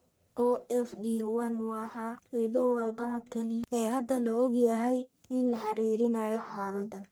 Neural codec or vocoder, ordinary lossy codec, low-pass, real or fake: codec, 44.1 kHz, 1.7 kbps, Pupu-Codec; none; none; fake